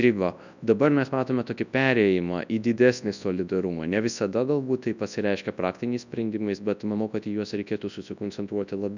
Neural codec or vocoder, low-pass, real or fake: codec, 24 kHz, 0.9 kbps, WavTokenizer, large speech release; 7.2 kHz; fake